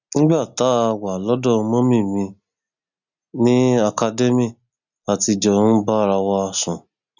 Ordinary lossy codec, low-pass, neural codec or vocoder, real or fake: none; 7.2 kHz; none; real